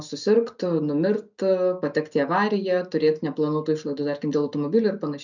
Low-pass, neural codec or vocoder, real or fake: 7.2 kHz; none; real